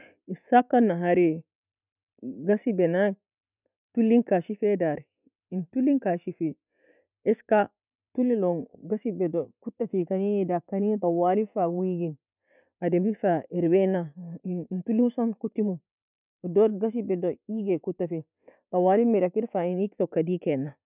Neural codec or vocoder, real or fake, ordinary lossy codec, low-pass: none; real; none; 3.6 kHz